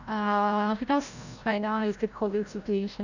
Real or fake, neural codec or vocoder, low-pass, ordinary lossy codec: fake; codec, 16 kHz, 0.5 kbps, FreqCodec, larger model; 7.2 kHz; none